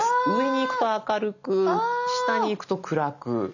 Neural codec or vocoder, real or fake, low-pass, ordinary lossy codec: none; real; 7.2 kHz; none